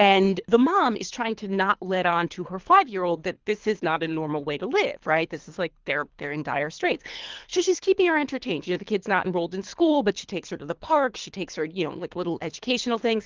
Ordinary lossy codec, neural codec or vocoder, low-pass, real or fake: Opus, 32 kbps; codec, 24 kHz, 3 kbps, HILCodec; 7.2 kHz; fake